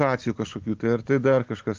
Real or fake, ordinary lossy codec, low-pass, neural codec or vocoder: real; Opus, 32 kbps; 7.2 kHz; none